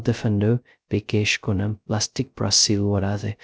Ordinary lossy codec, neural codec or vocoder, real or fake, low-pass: none; codec, 16 kHz, 0.2 kbps, FocalCodec; fake; none